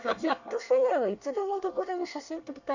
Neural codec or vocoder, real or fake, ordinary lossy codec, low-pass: codec, 24 kHz, 1 kbps, SNAC; fake; none; 7.2 kHz